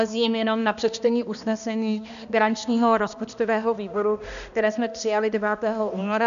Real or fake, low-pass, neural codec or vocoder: fake; 7.2 kHz; codec, 16 kHz, 1 kbps, X-Codec, HuBERT features, trained on balanced general audio